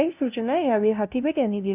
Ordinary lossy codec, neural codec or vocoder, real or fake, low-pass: none; codec, 16 kHz, 0.5 kbps, FunCodec, trained on LibriTTS, 25 frames a second; fake; 3.6 kHz